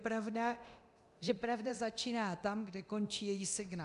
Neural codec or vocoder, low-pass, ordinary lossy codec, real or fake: codec, 24 kHz, 0.9 kbps, DualCodec; 10.8 kHz; MP3, 96 kbps; fake